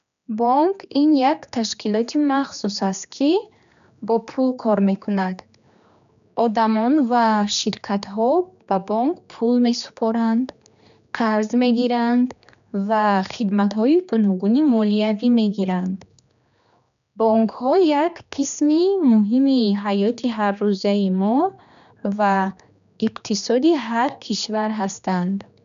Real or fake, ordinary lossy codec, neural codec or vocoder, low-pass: fake; none; codec, 16 kHz, 2 kbps, X-Codec, HuBERT features, trained on general audio; 7.2 kHz